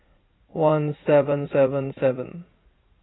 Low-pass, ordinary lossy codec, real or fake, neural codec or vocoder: 7.2 kHz; AAC, 16 kbps; fake; vocoder, 44.1 kHz, 80 mel bands, Vocos